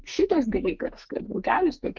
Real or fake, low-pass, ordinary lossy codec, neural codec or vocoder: fake; 7.2 kHz; Opus, 24 kbps; codec, 44.1 kHz, 2.6 kbps, SNAC